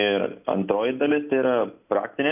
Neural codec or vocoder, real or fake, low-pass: none; real; 3.6 kHz